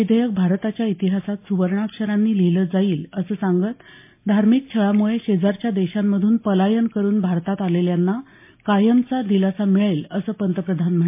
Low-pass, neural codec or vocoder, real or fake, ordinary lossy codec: 3.6 kHz; none; real; MP3, 24 kbps